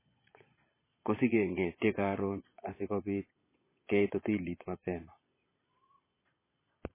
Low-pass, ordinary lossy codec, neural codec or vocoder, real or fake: 3.6 kHz; MP3, 16 kbps; vocoder, 22.05 kHz, 80 mel bands, Vocos; fake